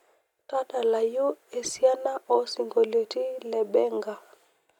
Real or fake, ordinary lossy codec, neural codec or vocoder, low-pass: real; none; none; 19.8 kHz